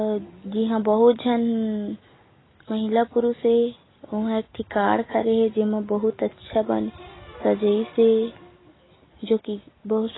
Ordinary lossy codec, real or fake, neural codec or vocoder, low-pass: AAC, 16 kbps; real; none; 7.2 kHz